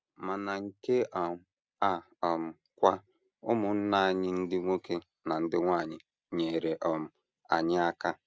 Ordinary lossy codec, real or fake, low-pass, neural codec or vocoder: none; real; none; none